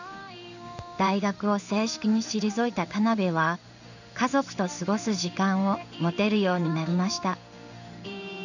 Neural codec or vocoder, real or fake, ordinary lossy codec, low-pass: codec, 16 kHz in and 24 kHz out, 1 kbps, XY-Tokenizer; fake; none; 7.2 kHz